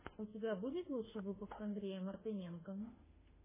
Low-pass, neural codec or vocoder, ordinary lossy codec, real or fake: 3.6 kHz; autoencoder, 48 kHz, 32 numbers a frame, DAC-VAE, trained on Japanese speech; MP3, 16 kbps; fake